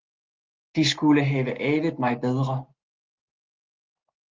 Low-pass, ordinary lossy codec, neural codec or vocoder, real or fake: 7.2 kHz; Opus, 16 kbps; none; real